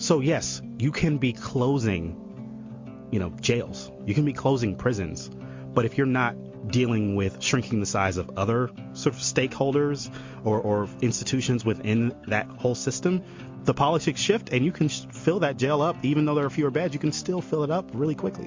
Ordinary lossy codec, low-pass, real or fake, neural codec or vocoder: MP3, 48 kbps; 7.2 kHz; real; none